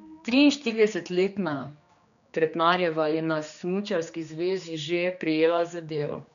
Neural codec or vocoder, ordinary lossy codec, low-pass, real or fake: codec, 16 kHz, 2 kbps, X-Codec, HuBERT features, trained on general audio; none; 7.2 kHz; fake